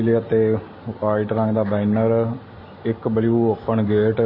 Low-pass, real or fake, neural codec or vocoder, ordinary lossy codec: 5.4 kHz; real; none; MP3, 24 kbps